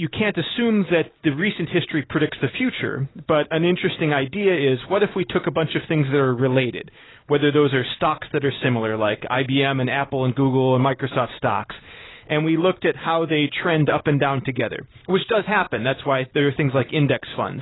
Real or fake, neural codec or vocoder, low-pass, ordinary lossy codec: real; none; 7.2 kHz; AAC, 16 kbps